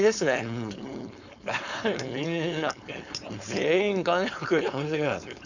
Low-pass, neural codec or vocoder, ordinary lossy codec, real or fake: 7.2 kHz; codec, 16 kHz, 4.8 kbps, FACodec; none; fake